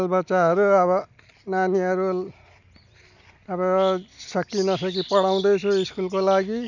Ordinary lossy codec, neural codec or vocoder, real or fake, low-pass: none; none; real; 7.2 kHz